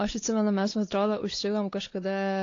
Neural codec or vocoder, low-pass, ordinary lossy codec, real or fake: codec, 16 kHz, 4 kbps, X-Codec, WavLM features, trained on Multilingual LibriSpeech; 7.2 kHz; AAC, 32 kbps; fake